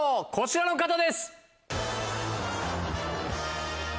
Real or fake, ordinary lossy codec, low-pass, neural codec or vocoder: real; none; none; none